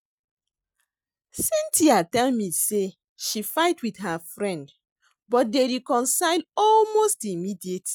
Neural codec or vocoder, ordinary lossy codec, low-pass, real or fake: none; none; none; real